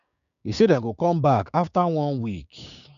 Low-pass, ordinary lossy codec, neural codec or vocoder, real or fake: 7.2 kHz; none; codec, 16 kHz, 6 kbps, DAC; fake